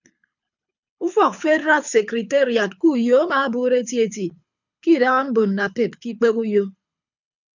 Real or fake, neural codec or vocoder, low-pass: fake; codec, 24 kHz, 6 kbps, HILCodec; 7.2 kHz